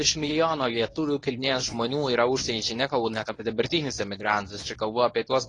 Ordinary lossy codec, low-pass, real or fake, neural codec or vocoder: AAC, 32 kbps; 10.8 kHz; fake; codec, 24 kHz, 0.9 kbps, WavTokenizer, medium speech release version 1